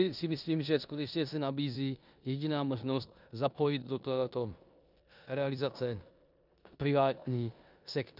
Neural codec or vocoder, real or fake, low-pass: codec, 16 kHz in and 24 kHz out, 0.9 kbps, LongCat-Audio-Codec, four codebook decoder; fake; 5.4 kHz